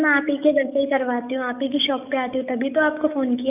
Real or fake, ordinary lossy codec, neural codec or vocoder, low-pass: fake; none; codec, 44.1 kHz, 7.8 kbps, DAC; 3.6 kHz